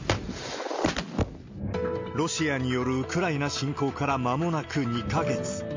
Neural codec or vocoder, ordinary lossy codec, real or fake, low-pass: none; MP3, 64 kbps; real; 7.2 kHz